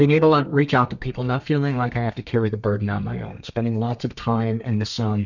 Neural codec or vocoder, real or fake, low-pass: codec, 32 kHz, 1.9 kbps, SNAC; fake; 7.2 kHz